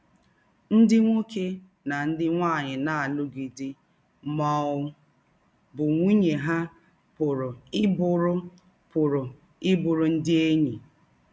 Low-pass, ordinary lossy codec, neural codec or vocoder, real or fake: none; none; none; real